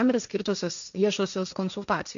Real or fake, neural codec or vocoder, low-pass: fake; codec, 16 kHz, 1.1 kbps, Voila-Tokenizer; 7.2 kHz